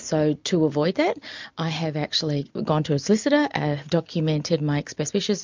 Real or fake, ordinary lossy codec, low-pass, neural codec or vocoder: real; MP3, 64 kbps; 7.2 kHz; none